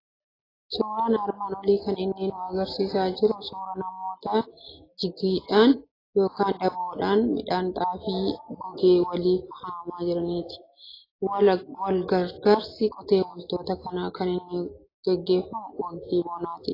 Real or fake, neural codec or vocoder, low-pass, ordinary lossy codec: real; none; 5.4 kHz; AAC, 24 kbps